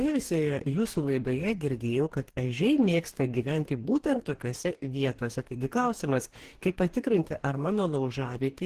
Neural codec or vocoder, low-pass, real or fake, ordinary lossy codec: codec, 44.1 kHz, 2.6 kbps, DAC; 14.4 kHz; fake; Opus, 16 kbps